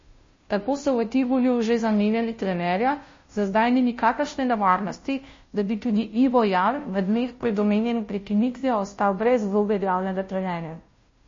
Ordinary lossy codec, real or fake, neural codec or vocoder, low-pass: MP3, 32 kbps; fake; codec, 16 kHz, 0.5 kbps, FunCodec, trained on Chinese and English, 25 frames a second; 7.2 kHz